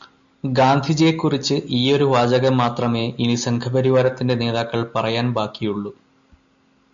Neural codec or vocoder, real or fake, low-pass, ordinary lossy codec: none; real; 7.2 kHz; MP3, 64 kbps